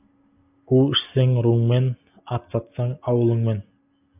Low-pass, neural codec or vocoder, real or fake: 3.6 kHz; none; real